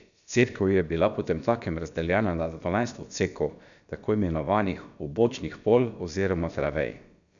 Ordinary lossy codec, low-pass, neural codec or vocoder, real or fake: none; 7.2 kHz; codec, 16 kHz, about 1 kbps, DyCAST, with the encoder's durations; fake